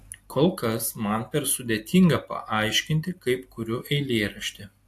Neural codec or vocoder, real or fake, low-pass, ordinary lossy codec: none; real; 14.4 kHz; AAC, 64 kbps